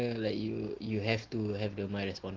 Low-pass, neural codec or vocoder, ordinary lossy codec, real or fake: 7.2 kHz; none; Opus, 16 kbps; real